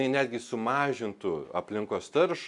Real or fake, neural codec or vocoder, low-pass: real; none; 10.8 kHz